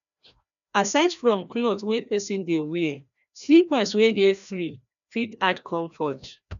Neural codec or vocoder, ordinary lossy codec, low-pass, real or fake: codec, 16 kHz, 1 kbps, FreqCodec, larger model; none; 7.2 kHz; fake